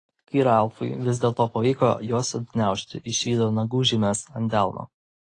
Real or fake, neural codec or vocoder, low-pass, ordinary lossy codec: real; none; 10.8 kHz; AAC, 32 kbps